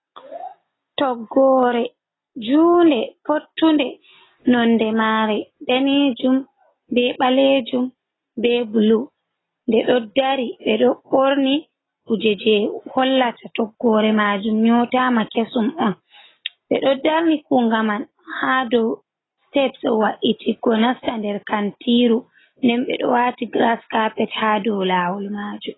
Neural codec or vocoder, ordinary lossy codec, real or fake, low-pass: none; AAC, 16 kbps; real; 7.2 kHz